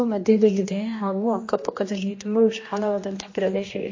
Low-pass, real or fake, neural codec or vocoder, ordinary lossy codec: 7.2 kHz; fake; codec, 16 kHz, 1 kbps, X-Codec, HuBERT features, trained on general audio; MP3, 32 kbps